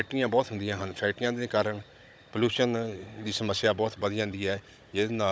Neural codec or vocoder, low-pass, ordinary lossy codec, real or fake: codec, 16 kHz, 16 kbps, FunCodec, trained on Chinese and English, 50 frames a second; none; none; fake